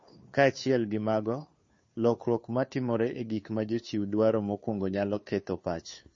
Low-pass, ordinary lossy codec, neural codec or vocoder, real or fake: 7.2 kHz; MP3, 32 kbps; codec, 16 kHz, 2 kbps, FunCodec, trained on Chinese and English, 25 frames a second; fake